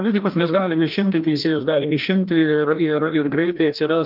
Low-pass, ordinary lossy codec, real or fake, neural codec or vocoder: 5.4 kHz; Opus, 32 kbps; fake; codec, 16 kHz, 1 kbps, FreqCodec, larger model